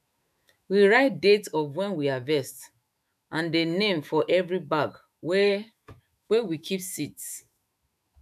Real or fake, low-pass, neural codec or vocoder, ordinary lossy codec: fake; 14.4 kHz; autoencoder, 48 kHz, 128 numbers a frame, DAC-VAE, trained on Japanese speech; none